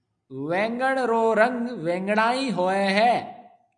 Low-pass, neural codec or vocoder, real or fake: 9.9 kHz; none; real